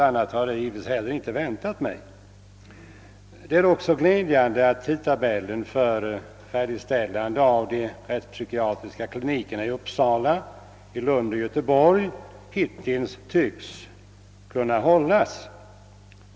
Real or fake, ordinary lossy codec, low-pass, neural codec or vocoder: real; none; none; none